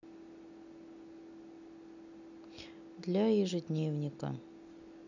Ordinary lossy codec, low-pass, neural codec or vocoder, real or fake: none; 7.2 kHz; none; real